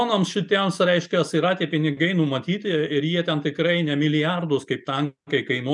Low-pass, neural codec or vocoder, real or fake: 10.8 kHz; none; real